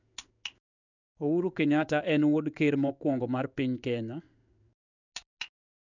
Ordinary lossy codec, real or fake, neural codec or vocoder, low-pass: none; fake; codec, 16 kHz in and 24 kHz out, 1 kbps, XY-Tokenizer; 7.2 kHz